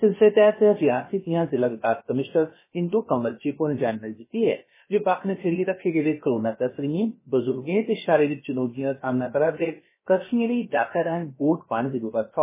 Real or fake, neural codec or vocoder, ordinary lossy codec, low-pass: fake; codec, 16 kHz, about 1 kbps, DyCAST, with the encoder's durations; MP3, 16 kbps; 3.6 kHz